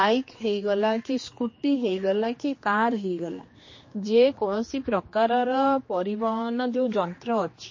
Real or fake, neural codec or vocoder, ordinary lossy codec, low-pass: fake; codec, 16 kHz, 2 kbps, X-Codec, HuBERT features, trained on general audio; MP3, 32 kbps; 7.2 kHz